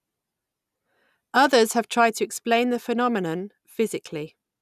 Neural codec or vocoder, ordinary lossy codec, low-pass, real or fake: none; none; 14.4 kHz; real